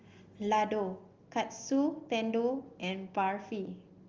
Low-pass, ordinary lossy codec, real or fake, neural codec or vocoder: 7.2 kHz; Opus, 32 kbps; real; none